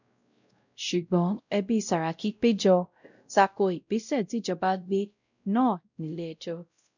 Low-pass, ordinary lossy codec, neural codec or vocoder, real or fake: 7.2 kHz; none; codec, 16 kHz, 0.5 kbps, X-Codec, WavLM features, trained on Multilingual LibriSpeech; fake